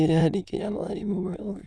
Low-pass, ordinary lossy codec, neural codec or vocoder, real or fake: none; none; autoencoder, 22.05 kHz, a latent of 192 numbers a frame, VITS, trained on many speakers; fake